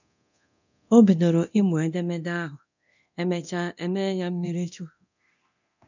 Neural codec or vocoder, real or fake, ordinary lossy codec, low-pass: codec, 24 kHz, 0.9 kbps, DualCodec; fake; none; 7.2 kHz